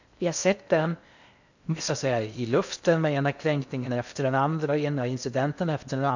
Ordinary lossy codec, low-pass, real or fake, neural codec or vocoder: none; 7.2 kHz; fake; codec, 16 kHz in and 24 kHz out, 0.6 kbps, FocalCodec, streaming, 2048 codes